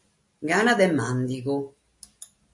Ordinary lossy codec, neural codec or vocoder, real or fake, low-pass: MP3, 48 kbps; none; real; 10.8 kHz